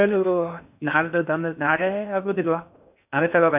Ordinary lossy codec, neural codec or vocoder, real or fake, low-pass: none; codec, 16 kHz in and 24 kHz out, 0.6 kbps, FocalCodec, streaming, 4096 codes; fake; 3.6 kHz